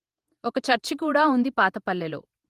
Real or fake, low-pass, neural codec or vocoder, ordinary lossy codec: fake; 14.4 kHz; vocoder, 48 kHz, 128 mel bands, Vocos; Opus, 24 kbps